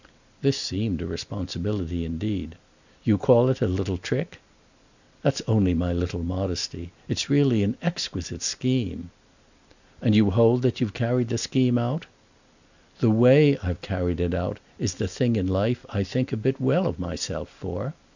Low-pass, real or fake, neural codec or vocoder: 7.2 kHz; real; none